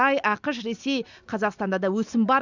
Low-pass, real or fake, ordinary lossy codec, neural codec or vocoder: 7.2 kHz; real; none; none